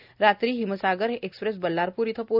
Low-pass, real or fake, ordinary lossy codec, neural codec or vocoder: 5.4 kHz; real; none; none